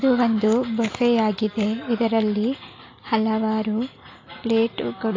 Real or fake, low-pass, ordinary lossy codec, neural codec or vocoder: real; 7.2 kHz; AAC, 32 kbps; none